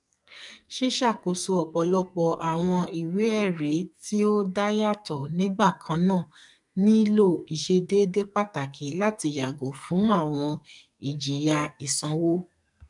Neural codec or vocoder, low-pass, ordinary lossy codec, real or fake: codec, 44.1 kHz, 2.6 kbps, SNAC; 10.8 kHz; none; fake